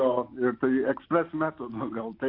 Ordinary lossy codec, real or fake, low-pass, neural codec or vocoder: AAC, 32 kbps; real; 5.4 kHz; none